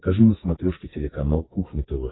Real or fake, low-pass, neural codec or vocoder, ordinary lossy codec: fake; 7.2 kHz; codec, 44.1 kHz, 2.6 kbps, SNAC; AAC, 16 kbps